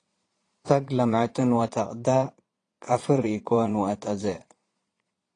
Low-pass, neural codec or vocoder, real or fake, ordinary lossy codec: 9.9 kHz; vocoder, 22.05 kHz, 80 mel bands, Vocos; fake; AAC, 32 kbps